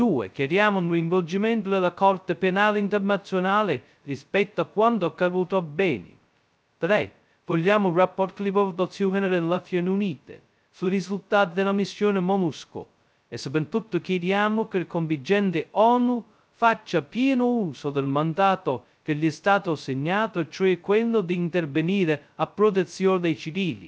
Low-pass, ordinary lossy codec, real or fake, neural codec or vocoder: none; none; fake; codec, 16 kHz, 0.2 kbps, FocalCodec